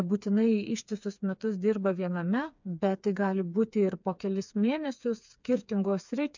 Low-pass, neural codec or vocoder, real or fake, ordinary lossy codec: 7.2 kHz; codec, 16 kHz, 4 kbps, FreqCodec, smaller model; fake; MP3, 64 kbps